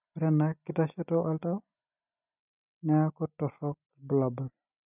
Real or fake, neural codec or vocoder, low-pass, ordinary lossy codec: real; none; 3.6 kHz; none